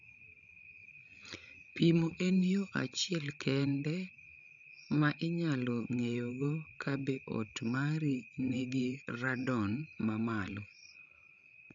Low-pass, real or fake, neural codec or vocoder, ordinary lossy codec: 7.2 kHz; fake; codec, 16 kHz, 16 kbps, FreqCodec, larger model; none